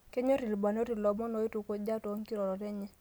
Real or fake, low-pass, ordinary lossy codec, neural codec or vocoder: real; none; none; none